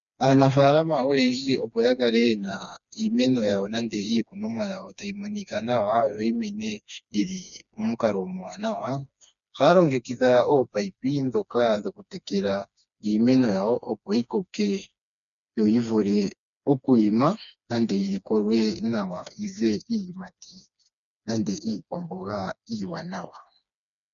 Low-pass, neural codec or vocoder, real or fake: 7.2 kHz; codec, 16 kHz, 2 kbps, FreqCodec, smaller model; fake